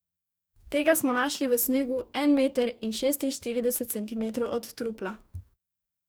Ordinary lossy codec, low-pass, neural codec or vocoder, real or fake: none; none; codec, 44.1 kHz, 2.6 kbps, DAC; fake